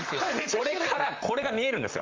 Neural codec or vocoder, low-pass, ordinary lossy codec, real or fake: vocoder, 44.1 kHz, 128 mel bands, Pupu-Vocoder; 7.2 kHz; Opus, 32 kbps; fake